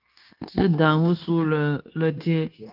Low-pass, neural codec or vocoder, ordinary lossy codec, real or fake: 5.4 kHz; codec, 16 kHz, 0.9 kbps, LongCat-Audio-Codec; Opus, 32 kbps; fake